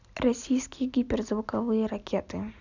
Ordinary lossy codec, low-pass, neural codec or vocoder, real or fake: none; 7.2 kHz; none; real